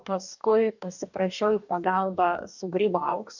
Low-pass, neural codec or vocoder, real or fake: 7.2 kHz; codec, 44.1 kHz, 2.6 kbps, DAC; fake